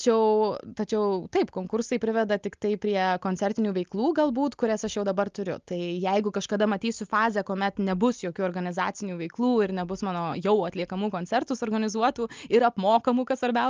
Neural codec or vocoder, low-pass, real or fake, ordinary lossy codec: none; 7.2 kHz; real; Opus, 32 kbps